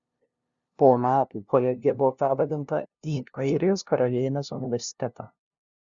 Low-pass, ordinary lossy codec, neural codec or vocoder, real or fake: 7.2 kHz; Opus, 64 kbps; codec, 16 kHz, 0.5 kbps, FunCodec, trained on LibriTTS, 25 frames a second; fake